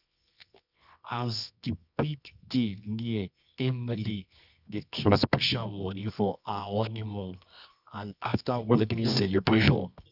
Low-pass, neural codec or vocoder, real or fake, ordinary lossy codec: 5.4 kHz; codec, 24 kHz, 0.9 kbps, WavTokenizer, medium music audio release; fake; none